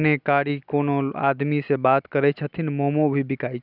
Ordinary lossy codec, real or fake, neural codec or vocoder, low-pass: none; real; none; 5.4 kHz